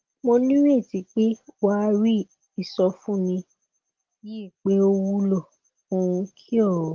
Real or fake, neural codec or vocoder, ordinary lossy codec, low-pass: real; none; Opus, 16 kbps; 7.2 kHz